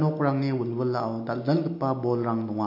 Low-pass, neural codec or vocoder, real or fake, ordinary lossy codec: 5.4 kHz; none; real; MP3, 32 kbps